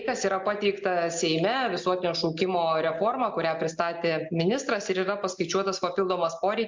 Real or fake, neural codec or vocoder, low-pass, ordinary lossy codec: real; none; 7.2 kHz; MP3, 64 kbps